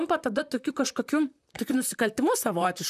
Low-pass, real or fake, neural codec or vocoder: 14.4 kHz; fake; vocoder, 44.1 kHz, 128 mel bands, Pupu-Vocoder